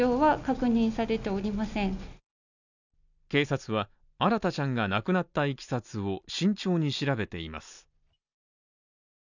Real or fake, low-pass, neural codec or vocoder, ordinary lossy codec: real; 7.2 kHz; none; none